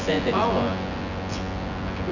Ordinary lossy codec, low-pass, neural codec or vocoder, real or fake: none; 7.2 kHz; vocoder, 24 kHz, 100 mel bands, Vocos; fake